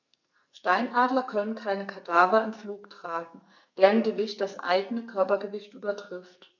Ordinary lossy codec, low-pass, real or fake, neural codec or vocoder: none; 7.2 kHz; fake; codec, 44.1 kHz, 2.6 kbps, SNAC